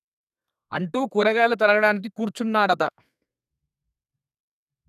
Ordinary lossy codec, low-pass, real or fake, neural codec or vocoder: none; 14.4 kHz; fake; codec, 32 kHz, 1.9 kbps, SNAC